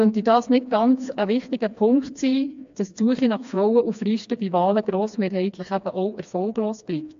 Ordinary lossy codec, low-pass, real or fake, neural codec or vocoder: AAC, 64 kbps; 7.2 kHz; fake; codec, 16 kHz, 2 kbps, FreqCodec, smaller model